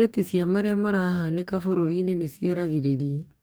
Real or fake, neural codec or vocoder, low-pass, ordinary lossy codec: fake; codec, 44.1 kHz, 2.6 kbps, DAC; none; none